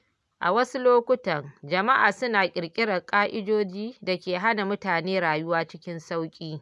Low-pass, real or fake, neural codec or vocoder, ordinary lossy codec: none; real; none; none